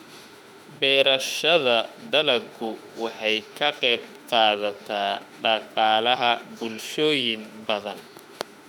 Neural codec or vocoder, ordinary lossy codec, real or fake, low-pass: autoencoder, 48 kHz, 32 numbers a frame, DAC-VAE, trained on Japanese speech; none; fake; 19.8 kHz